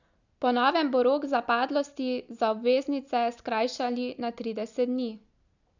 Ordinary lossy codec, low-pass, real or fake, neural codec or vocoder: none; 7.2 kHz; real; none